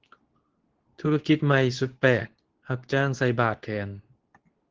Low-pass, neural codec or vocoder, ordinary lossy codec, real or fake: 7.2 kHz; codec, 24 kHz, 0.9 kbps, WavTokenizer, medium speech release version 2; Opus, 16 kbps; fake